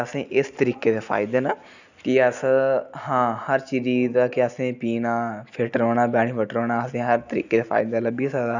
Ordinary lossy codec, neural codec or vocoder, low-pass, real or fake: none; none; 7.2 kHz; real